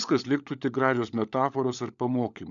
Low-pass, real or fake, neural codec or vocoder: 7.2 kHz; fake; codec, 16 kHz, 16 kbps, FunCodec, trained on LibriTTS, 50 frames a second